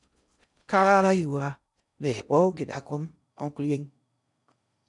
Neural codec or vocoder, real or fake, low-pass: codec, 16 kHz in and 24 kHz out, 0.6 kbps, FocalCodec, streaming, 4096 codes; fake; 10.8 kHz